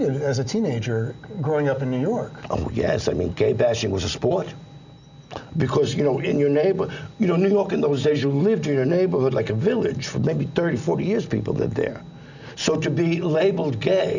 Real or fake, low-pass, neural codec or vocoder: real; 7.2 kHz; none